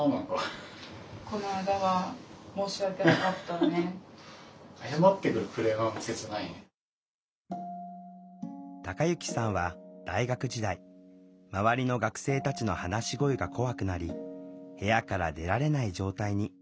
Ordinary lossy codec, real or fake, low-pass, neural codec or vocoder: none; real; none; none